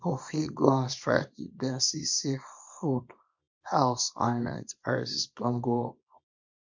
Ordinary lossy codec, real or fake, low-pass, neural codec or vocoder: MP3, 48 kbps; fake; 7.2 kHz; codec, 24 kHz, 0.9 kbps, WavTokenizer, small release